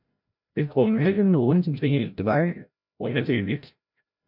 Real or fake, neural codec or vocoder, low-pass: fake; codec, 16 kHz, 0.5 kbps, FreqCodec, larger model; 5.4 kHz